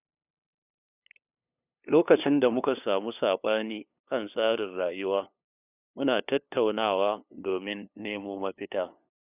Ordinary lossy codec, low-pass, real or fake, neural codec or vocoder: none; 3.6 kHz; fake; codec, 16 kHz, 2 kbps, FunCodec, trained on LibriTTS, 25 frames a second